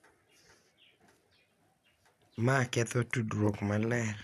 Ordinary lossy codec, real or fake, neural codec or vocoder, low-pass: none; real; none; 14.4 kHz